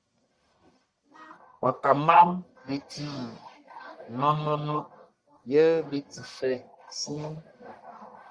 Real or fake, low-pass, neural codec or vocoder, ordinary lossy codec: fake; 9.9 kHz; codec, 44.1 kHz, 1.7 kbps, Pupu-Codec; Opus, 64 kbps